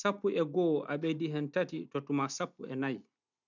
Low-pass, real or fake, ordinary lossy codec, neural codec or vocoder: 7.2 kHz; real; none; none